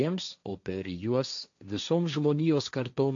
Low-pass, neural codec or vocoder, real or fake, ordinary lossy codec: 7.2 kHz; codec, 16 kHz, 1.1 kbps, Voila-Tokenizer; fake; AAC, 64 kbps